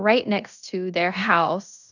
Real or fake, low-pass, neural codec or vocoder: fake; 7.2 kHz; codec, 16 kHz in and 24 kHz out, 0.9 kbps, LongCat-Audio-Codec, fine tuned four codebook decoder